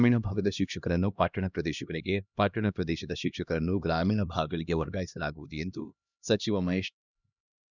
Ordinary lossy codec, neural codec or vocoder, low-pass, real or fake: none; codec, 16 kHz, 1 kbps, X-Codec, HuBERT features, trained on LibriSpeech; 7.2 kHz; fake